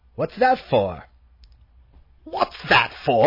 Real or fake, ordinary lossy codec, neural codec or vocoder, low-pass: real; MP3, 24 kbps; none; 5.4 kHz